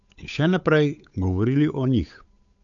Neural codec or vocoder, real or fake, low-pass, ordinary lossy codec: codec, 16 kHz, 8 kbps, FunCodec, trained on Chinese and English, 25 frames a second; fake; 7.2 kHz; none